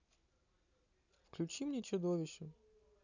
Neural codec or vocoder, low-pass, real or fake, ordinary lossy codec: none; 7.2 kHz; real; none